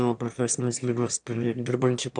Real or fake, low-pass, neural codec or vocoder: fake; 9.9 kHz; autoencoder, 22.05 kHz, a latent of 192 numbers a frame, VITS, trained on one speaker